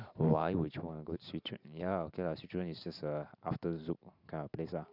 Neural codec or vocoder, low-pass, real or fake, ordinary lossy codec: vocoder, 44.1 kHz, 128 mel bands every 512 samples, BigVGAN v2; 5.4 kHz; fake; none